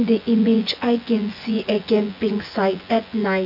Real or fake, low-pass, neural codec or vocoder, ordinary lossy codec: fake; 5.4 kHz; vocoder, 24 kHz, 100 mel bands, Vocos; AAC, 48 kbps